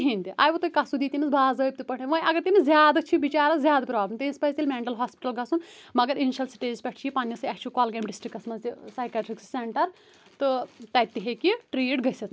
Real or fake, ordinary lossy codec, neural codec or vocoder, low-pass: real; none; none; none